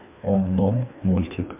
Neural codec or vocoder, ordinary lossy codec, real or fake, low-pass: codec, 16 kHz, 2 kbps, FreqCodec, larger model; MP3, 32 kbps; fake; 3.6 kHz